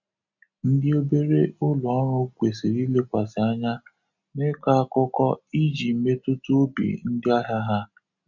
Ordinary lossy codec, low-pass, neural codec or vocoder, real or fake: none; 7.2 kHz; none; real